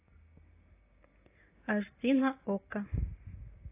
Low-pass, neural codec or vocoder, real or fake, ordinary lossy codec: 3.6 kHz; none; real; AAC, 16 kbps